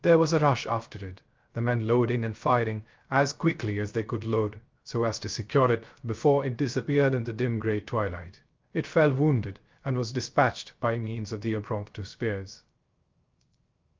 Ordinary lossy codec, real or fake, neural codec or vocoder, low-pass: Opus, 24 kbps; fake; codec, 16 kHz, 0.3 kbps, FocalCodec; 7.2 kHz